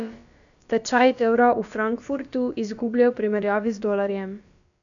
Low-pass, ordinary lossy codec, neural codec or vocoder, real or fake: 7.2 kHz; none; codec, 16 kHz, about 1 kbps, DyCAST, with the encoder's durations; fake